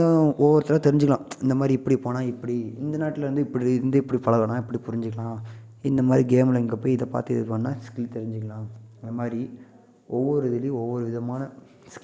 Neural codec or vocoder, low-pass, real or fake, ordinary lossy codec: none; none; real; none